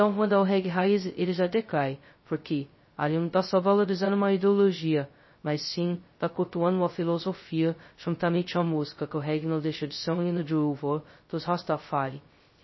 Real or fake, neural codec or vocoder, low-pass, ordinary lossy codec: fake; codec, 16 kHz, 0.2 kbps, FocalCodec; 7.2 kHz; MP3, 24 kbps